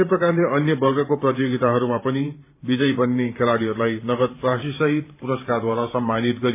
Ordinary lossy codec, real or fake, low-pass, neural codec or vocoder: MP3, 24 kbps; fake; 3.6 kHz; vocoder, 44.1 kHz, 128 mel bands every 512 samples, BigVGAN v2